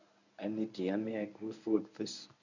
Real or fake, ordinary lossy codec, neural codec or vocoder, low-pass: fake; none; codec, 24 kHz, 0.9 kbps, WavTokenizer, medium speech release version 1; 7.2 kHz